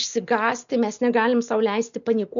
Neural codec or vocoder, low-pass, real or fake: none; 7.2 kHz; real